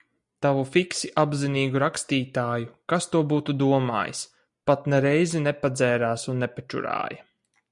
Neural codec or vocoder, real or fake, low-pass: none; real; 10.8 kHz